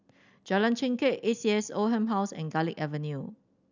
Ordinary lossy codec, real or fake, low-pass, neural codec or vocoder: none; real; 7.2 kHz; none